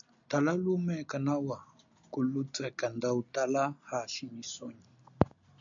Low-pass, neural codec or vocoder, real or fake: 7.2 kHz; none; real